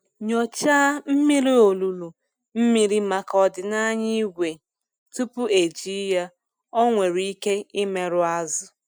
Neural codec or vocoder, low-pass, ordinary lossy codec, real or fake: none; none; none; real